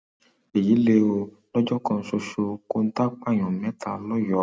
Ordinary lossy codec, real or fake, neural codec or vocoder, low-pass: none; real; none; none